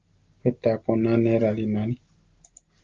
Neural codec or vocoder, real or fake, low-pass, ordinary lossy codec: none; real; 7.2 kHz; Opus, 32 kbps